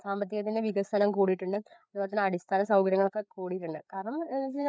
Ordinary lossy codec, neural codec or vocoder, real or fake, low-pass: none; codec, 16 kHz, 4 kbps, FreqCodec, larger model; fake; none